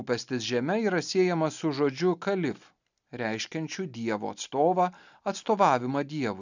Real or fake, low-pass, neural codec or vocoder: real; 7.2 kHz; none